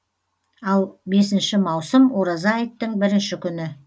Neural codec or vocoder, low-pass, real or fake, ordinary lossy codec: none; none; real; none